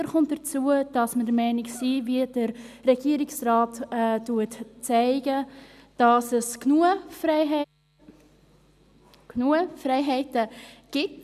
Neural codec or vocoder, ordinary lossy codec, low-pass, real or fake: none; none; 14.4 kHz; real